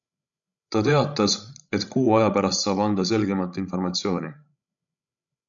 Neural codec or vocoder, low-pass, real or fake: codec, 16 kHz, 16 kbps, FreqCodec, larger model; 7.2 kHz; fake